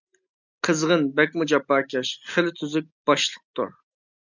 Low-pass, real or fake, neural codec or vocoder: 7.2 kHz; real; none